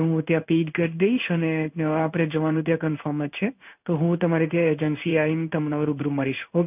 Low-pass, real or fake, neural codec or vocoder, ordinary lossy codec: 3.6 kHz; fake; codec, 16 kHz in and 24 kHz out, 1 kbps, XY-Tokenizer; none